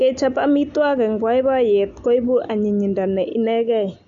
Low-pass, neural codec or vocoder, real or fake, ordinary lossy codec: 7.2 kHz; none; real; AAC, 64 kbps